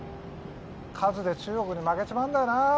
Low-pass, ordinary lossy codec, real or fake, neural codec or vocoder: none; none; real; none